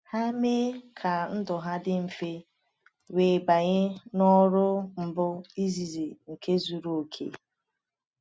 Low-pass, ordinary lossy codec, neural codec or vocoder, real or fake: none; none; none; real